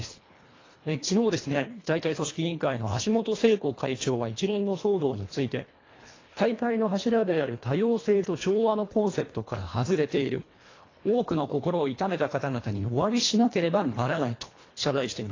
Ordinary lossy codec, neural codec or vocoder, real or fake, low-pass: AAC, 32 kbps; codec, 24 kHz, 1.5 kbps, HILCodec; fake; 7.2 kHz